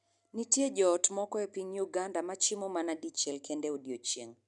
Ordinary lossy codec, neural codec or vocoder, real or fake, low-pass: none; none; real; 10.8 kHz